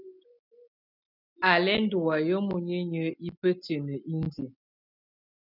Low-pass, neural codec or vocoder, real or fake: 5.4 kHz; none; real